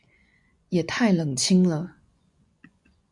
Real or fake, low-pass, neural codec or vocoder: fake; 10.8 kHz; vocoder, 24 kHz, 100 mel bands, Vocos